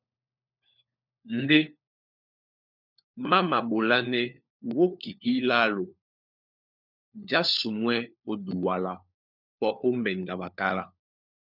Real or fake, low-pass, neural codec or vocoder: fake; 5.4 kHz; codec, 16 kHz, 4 kbps, FunCodec, trained on LibriTTS, 50 frames a second